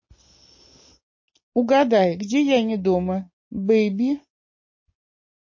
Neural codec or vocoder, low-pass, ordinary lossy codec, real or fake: none; 7.2 kHz; MP3, 32 kbps; real